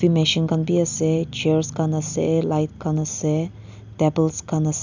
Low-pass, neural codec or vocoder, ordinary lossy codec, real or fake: 7.2 kHz; none; none; real